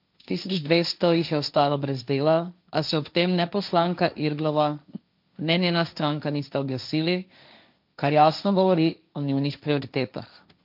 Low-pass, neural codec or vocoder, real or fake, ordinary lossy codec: 5.4 kHz; codec, 16 kHz, 1.1 kbps, Voila-Tokenizer; fake; MP3, 48 kbps